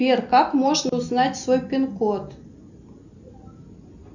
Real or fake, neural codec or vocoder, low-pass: real; none; 7.2 kHz